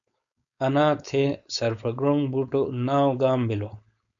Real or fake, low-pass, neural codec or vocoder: fake; 7.2 kHz; codec, 16 kHz, 4.8 kbps, FACodec